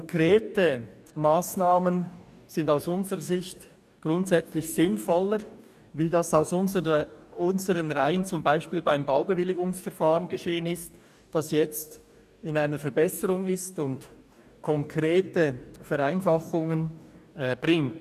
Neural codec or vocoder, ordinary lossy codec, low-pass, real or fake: codec, 44.1 kHz, 2.6 kbps, DAC; none; 14.4 kHz; fake